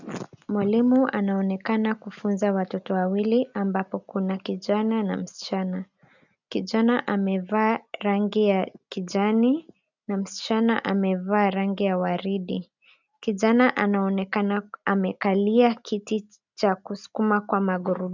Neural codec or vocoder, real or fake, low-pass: none; real; 7.2 kHz